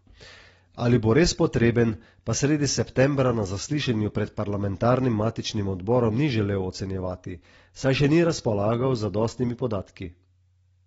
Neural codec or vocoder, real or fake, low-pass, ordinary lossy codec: none; real; 19.8 kHz; AAC, 24 kbps